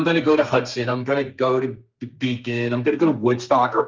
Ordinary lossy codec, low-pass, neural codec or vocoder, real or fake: Opus, 32 kbps; 7.2 kHz; codec, 32 kHz, 1.9 kbps, SNAC; fake